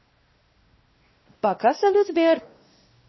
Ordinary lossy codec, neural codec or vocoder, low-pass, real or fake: MP3, 24 kbps; codec, 16 kHz, 1 kbps, X-Codec, WavLM features, trained on Multilingual LibriSpeech; 7.2 kHz; fake